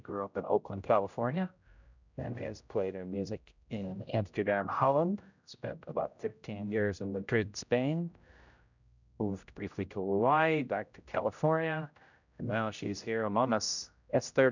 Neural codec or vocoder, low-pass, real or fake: codec, 16 kHz, 0.5 kbps, X-Codec, HuBERT features, trained on general audio; 7.2 kHz; fake